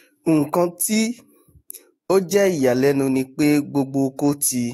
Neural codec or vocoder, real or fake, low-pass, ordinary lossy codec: autoencoder, 48 kHz, 128 numbers a frame, DAC-VAE, trained on Japanese speech; fake; 19.8 kHz; AAC, 48 kbps